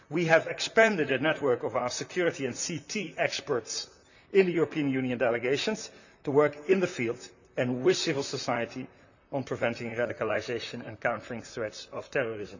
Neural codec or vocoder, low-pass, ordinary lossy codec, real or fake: vocoder, 44.1 kHz, 128 mel bands, Pupu-Vocoder; 7.2 kHz; none; fake